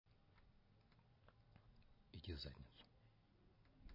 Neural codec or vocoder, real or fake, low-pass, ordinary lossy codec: none; real; 5.4 kHz; MP3, 24 kbps